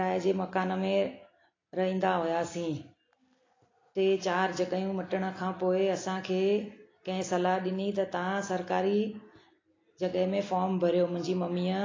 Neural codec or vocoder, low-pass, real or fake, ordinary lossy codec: none; 7.2 kHz; real; AAC, 32 kbps